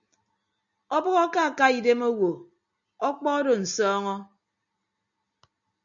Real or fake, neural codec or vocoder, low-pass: real; none; 7.2 kHz